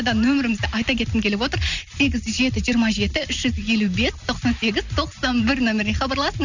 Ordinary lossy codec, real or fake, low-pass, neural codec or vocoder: none; real; 7.2 kHz; none